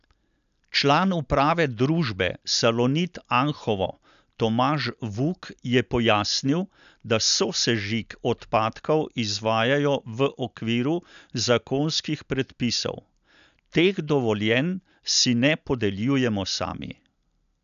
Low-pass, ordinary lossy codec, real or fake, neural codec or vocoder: 7.2 kHz; none; real; none